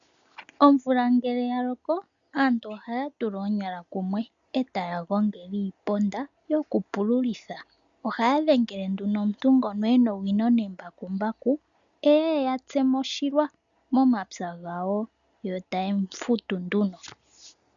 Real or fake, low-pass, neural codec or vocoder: real; 7.2 kHz; none